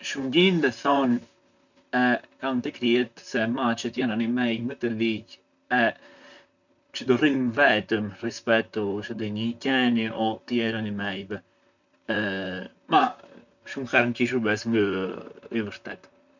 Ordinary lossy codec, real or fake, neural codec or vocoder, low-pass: none; fake; vocoder, 44.1 kHz, 128 mel bands, Pupu-Vocoder; 7.2 kHz